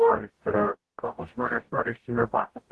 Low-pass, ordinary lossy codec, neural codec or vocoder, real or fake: 10.8 kHz; Opus, 16 kbps; codec, 44.1 kHz, 0.9 kbps, DAC; fake